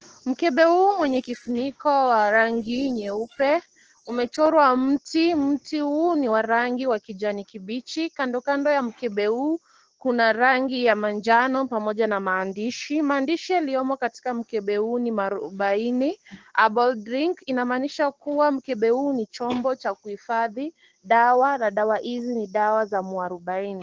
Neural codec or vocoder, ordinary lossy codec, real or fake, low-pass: codec, 16 kHz, 6 kbps, DAC; Opus, 16 kbps; fake; 7.2 kHz